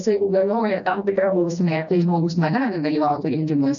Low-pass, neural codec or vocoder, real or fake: 7.2 kHz; codec, 16 kHz, 1 kbps, FreqCodec, smaller model; fake